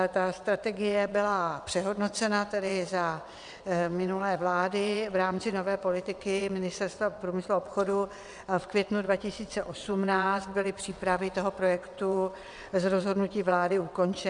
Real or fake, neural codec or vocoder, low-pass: fake; vocoder, 22.05 kHz, 80 mel bands, WaveNeXt; 9.9 kHz